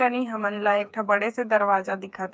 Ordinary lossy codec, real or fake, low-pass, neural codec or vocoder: none; fake; none; codec, 16 kHz, 4 kbps, FreqCodec, smaller model